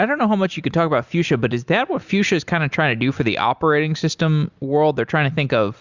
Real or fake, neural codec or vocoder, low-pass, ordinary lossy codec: real; none; 7.2 kHz; Opus, 64 kbps